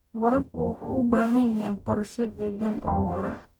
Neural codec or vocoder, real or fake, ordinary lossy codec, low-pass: codec, 44.1 kHz, 0.9 kbps, DAC; fake; none; 19.8 kHz